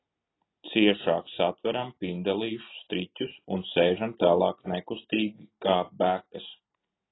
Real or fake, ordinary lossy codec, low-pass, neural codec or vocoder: real; AAC, 16 kbps; 7.2 kHz; none